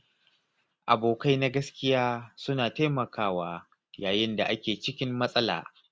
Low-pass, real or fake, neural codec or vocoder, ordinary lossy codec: none; real; none; none